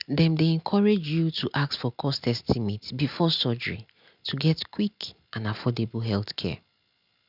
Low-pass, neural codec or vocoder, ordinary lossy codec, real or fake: 5.4 kHz; none; none; real